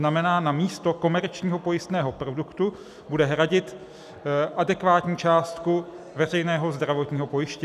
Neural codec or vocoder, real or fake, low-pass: none; real; 14.4 kHz